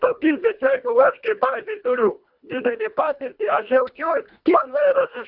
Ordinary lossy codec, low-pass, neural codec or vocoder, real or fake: Opus, 64 kbps; 5.4 kHz; codec, 24 kHz, 1.5 kbps, HILCodec; fake